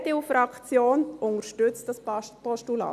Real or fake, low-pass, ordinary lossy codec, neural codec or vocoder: real; 14.4 kHz; none; none